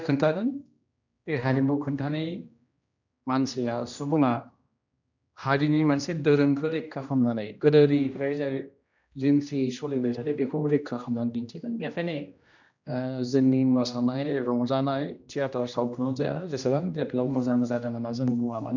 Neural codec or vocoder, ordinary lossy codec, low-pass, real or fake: codec, 16 kHz, 1 kbps, X-Codec, HuBERT features, trained on general audio; none; 7.2 kHz; fake